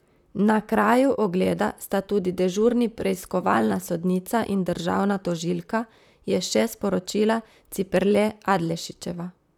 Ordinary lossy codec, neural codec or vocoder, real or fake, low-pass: none; vocoder, 44.1 kHz, 128 mel bands, Pupu-Vocoder; fake; 19.8 kHz